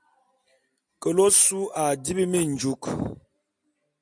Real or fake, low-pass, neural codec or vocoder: real; 9.9 kHz; none